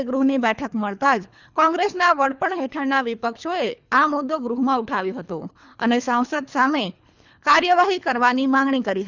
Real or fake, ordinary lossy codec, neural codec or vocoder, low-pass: fake; Opus, 64 kbps; codec, 24 kHz, 3 kbps, HILCodec; 7.2 kHz